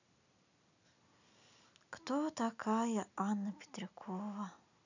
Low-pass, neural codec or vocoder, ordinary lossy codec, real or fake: 7.2 kHz; none; none; real